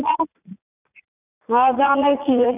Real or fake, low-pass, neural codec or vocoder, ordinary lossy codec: fake; 3.6 kHz; vocoder, 44.1 kHz, 80 mel bands, Vocos; none